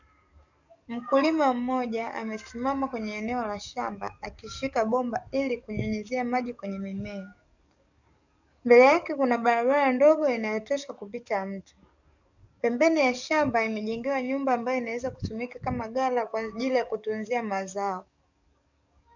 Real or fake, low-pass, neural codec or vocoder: fake; 7.2 kHz; codec, 44.1 kHz, 7.8 kbps, DAC